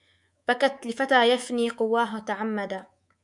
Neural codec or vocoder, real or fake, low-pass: codec, 24 kHz, 3.1 kbps, DualCodec; fake; 10.8 kHz